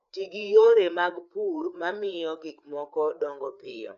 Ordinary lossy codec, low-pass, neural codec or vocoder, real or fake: none; 7.2 kHz; codec, 16 kHz, 4 kbps, FreqCodec, larger model; fake